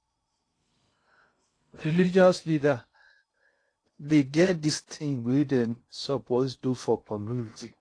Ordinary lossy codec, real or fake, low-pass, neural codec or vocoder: AAC, 48 kbps; fake; 9.9 kHz; codec, 16 kHz in and 24 kHz out, 0.6 kbps, FocalCodec, streaming, 2048 codes